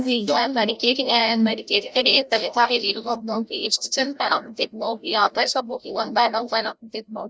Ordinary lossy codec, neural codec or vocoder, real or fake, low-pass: none; codec, 16 kHz, 0.5 kbps, FreqCodec, larger model; fake; none